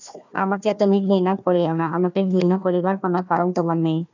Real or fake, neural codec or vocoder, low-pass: fake; codec, 16 kHz, 1 kbps, FunCodec, trained on Chinese and English, 50 frames a second; 7.2 kHz